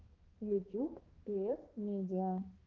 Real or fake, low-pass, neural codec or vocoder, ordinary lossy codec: fake; 7.2 kHz; codec, 16 kHz, 2 kbps, X-Codec, HuBERT features, trained on balanced general audio; Opus, 16 kbps